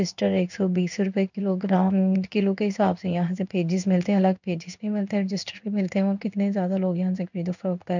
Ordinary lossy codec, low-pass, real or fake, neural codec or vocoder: none; 7.2 kHz; fake; codec, 16 kHz in and 24 kHz out, 1 kbps, XY-Tokenizer